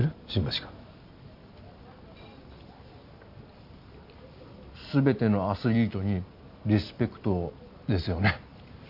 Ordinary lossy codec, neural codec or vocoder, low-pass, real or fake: none; none; 5.4 kHz; real